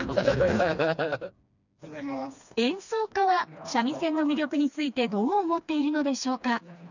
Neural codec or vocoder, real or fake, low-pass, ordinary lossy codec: codec, 16 kHz, 2 kbps, FreqCodec, smaller model; fake; 7.2 kHz; none